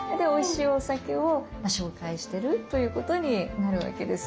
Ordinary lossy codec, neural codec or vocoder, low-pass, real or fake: none; none; none; real